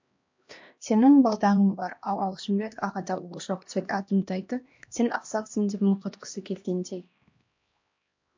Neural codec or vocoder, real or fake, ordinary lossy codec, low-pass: codec, 16 kHz, 1 kbps, X-Codec, HuBERT features, trained on LibriSpeech; fake; MP3, 48 kbps; 7.2 kHz